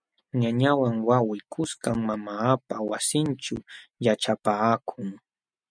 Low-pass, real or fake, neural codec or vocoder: 9.9 kHz; real; none